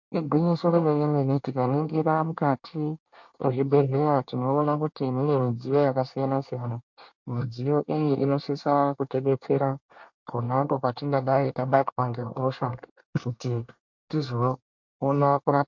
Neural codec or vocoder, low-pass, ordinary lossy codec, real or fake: codec, 24 kHz, 1 kbps, SNAC; 7.2 kHz; MP3, 48 kbps; fake